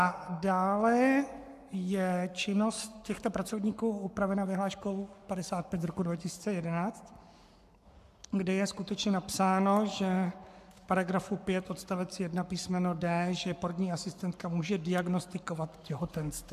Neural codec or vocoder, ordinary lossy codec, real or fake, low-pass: codec, 44.1 kHz, 7.8 kbps, Pupu-Codec; AAC, 96 kbps; fake; 14.4 kHz